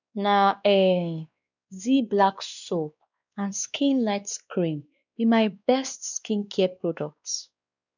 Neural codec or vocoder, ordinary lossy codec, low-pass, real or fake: codec, 16 kHz, 2 kbps, X-Codec, WavLM features, trained on Multilingual LibriSpeech; none; 7.2 kHz; fake